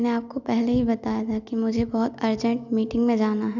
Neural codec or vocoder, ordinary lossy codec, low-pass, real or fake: none; none; 7.2 kHz; real